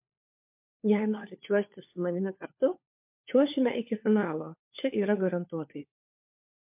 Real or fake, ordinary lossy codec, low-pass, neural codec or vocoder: fake; MP3, 32 kbps; 3.6 kHz; codec, 16 kHz, 4 kbps, FunCodec, trained on LibriTTS, 50 frames a second